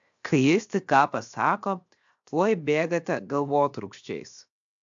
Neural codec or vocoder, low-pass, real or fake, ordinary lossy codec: codec, 16 kHz, 0.7 kbps, FocalCodec; 7.2 kHz; fake; AAC, 64 kbps